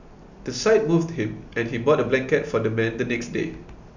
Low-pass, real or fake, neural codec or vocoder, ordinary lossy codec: 7.2 kHz; real; none; none